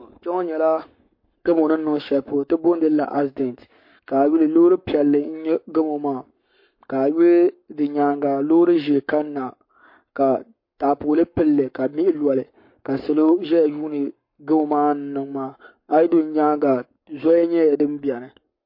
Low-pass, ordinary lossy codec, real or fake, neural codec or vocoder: 5.4 kHz; MP3, 32 kbps; fake; codec, 44.1 kHz, 7.8 kbps, Pupu-Codec